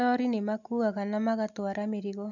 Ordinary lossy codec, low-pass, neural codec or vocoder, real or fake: none; 7.2 kHz; none; real